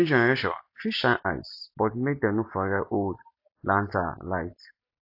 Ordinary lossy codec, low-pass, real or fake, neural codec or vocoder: AAC, 48 kbps; 5.4 kHz; real; none